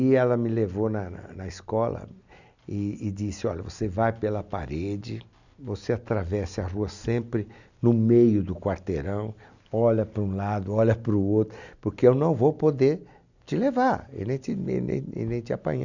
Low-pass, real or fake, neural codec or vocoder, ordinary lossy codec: 7.2 kHz; real; none; none